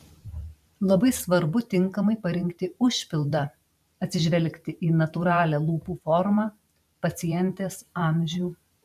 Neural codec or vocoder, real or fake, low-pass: vocoder, 44.1 kHz, 128 mel bands every 512 samples, BigVGAN v2; fake; 14.4 kHz